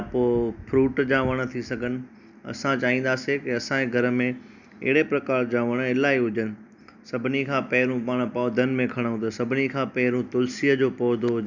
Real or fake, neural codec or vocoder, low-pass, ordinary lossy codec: real; none; 7.2 kHz; none